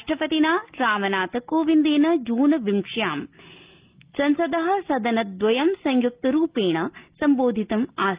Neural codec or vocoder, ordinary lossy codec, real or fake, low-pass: none; Opus, 32 kbps; real; 3.6 kHz